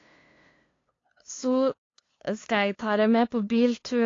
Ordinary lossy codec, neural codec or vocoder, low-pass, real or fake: AAC, 32 kbps; codec, 16 kHz, 2 kbps, FunCodec, trained on LibriTTS, 25 frames a second; 7.2 kHz; fake